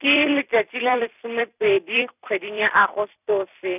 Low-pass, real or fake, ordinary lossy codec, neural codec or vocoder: 3.6 kHz; fake; none; vocoder, 44.1 kHz, 128 mel bands every 256 samples, BigVGAN v2